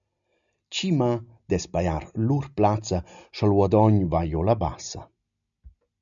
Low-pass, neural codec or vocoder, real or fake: 7.2 kHz; none; real